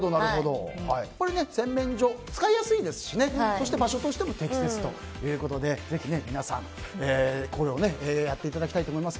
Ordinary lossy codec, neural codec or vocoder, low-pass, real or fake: none; none; none; real